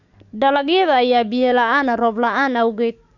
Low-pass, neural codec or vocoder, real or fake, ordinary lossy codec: 7.2 kHz; codec, 44.1 kHz, 7.8 kbps, DAC; fake; none